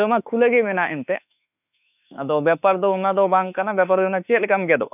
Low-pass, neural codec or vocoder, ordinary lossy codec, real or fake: 3.6 kHz; autoencoder, 48 kHz, 32 numbers a frame, DAC-VAE, trained on Japanese speech; none; fake